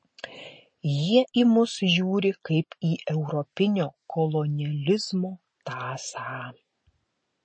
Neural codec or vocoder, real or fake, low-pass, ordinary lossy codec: none; real; 9.9 kHz; MP3, 32 kbps